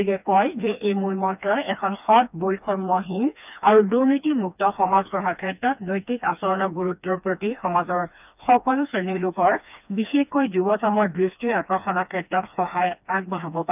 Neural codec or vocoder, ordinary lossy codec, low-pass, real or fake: codec, 16 kHz, 2 kbps, FreqCodec, smaller model; none; 3.6 kHz; fake